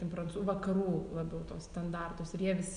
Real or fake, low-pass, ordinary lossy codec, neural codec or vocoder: real; 9.9 kHz; MP3, 96 kbps; none